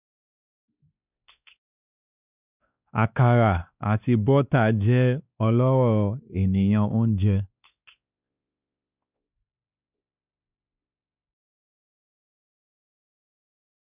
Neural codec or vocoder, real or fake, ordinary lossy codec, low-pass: codec, 16 kHz, 2 kbps, X-Codec, WavLM features, trained on Multilingual LibriSpeech; fake; none; 3.6 kHz